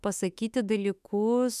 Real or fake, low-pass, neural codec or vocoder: fake; 14.4 kHz; autoencoder, 48 kHz, 32 numbers a frame, DAC-VAE, trained on Japanese speech